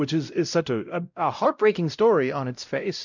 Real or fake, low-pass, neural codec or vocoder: fake; 7.2 kHz; codec, 16 kHz, 0.5 kbps, X-Codec, WavLM features, trained on Multilingual LibriSpeech